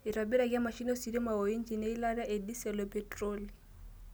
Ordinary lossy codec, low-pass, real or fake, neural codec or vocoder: none; none; real; none